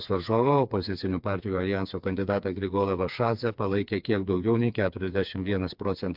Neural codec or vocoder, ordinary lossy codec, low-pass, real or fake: codec, 16 kHz, 4 kbps, FreqCodec, smaller model; MP3, 48 kbps; 5.4 kHz; fake